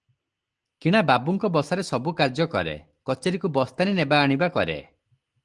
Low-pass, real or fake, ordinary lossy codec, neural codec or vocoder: 10.8 kHz; real; Opus, 24 kbps; none